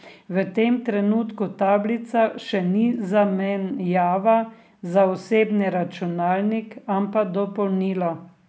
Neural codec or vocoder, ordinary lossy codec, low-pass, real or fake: none; none; none; real